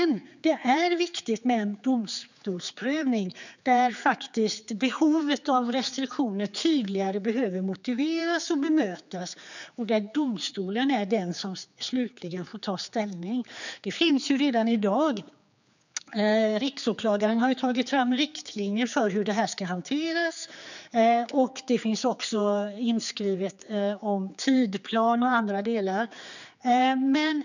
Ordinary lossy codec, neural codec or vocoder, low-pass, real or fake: none; codec, 16 kHz, 4 kbps, X-Codec, HuBERT features, trained on general audio; 7.2 kHz; fake